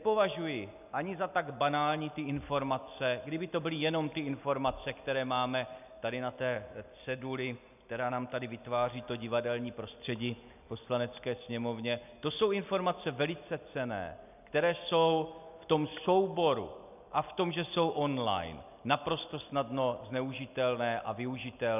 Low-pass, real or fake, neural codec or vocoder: 3.6 kHz; real; none